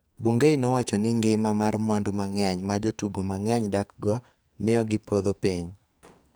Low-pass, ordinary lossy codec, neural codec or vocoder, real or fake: none; none; codec, 44.1 kHz, 2.6 kbps, SNAC; fake